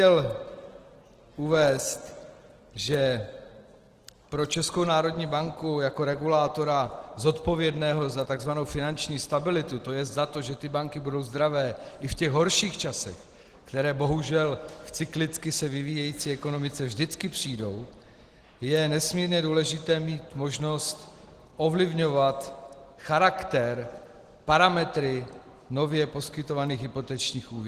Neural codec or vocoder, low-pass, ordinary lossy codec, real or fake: none; 14.4 kHz; Opus, 16 kbps; real